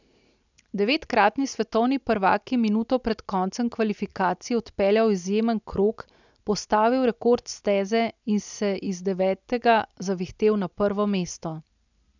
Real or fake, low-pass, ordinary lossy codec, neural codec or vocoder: real; 7.2 kHz; none; none